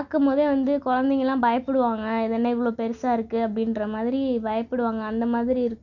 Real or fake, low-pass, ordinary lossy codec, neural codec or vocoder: real; 7.2 kHz; none; none